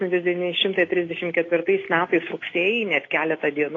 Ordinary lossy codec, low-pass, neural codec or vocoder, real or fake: AAC, 32 kbps; 7.2 kHz; none; real